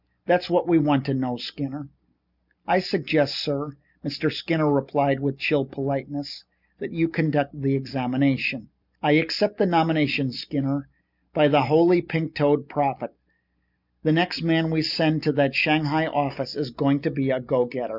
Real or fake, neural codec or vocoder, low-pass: real; none; 5.4 kHz